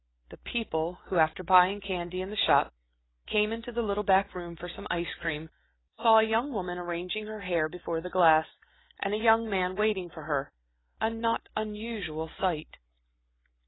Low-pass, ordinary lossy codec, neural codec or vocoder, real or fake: 7.2 kHz; AAC, 16 kbps; none; real